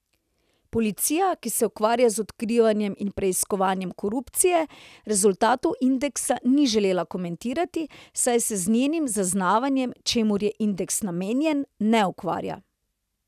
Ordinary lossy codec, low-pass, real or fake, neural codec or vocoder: none; 14.4 kHz; real; none